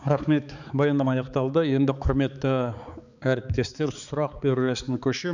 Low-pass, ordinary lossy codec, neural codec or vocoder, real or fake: 7.2 kHz; none; codec, 16 kHz, 4 kbps, X-Codec, HuBERT features, trained on balanced general audio; fake